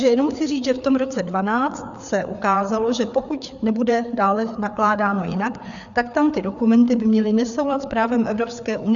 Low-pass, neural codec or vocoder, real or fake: 7.2 kHz; codec, 16 kHz, 4 kbps, FreqCodec, larger model; fake